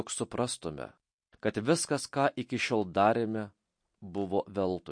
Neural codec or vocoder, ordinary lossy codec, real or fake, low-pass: none; MP3, 48 kbps; real; 9.9 kHz